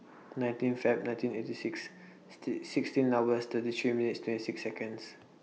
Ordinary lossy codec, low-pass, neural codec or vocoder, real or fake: none; none; none; real